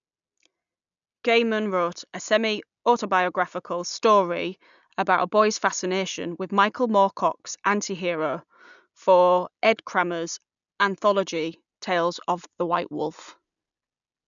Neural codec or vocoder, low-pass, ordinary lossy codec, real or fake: none; 7.2 kHz; none; real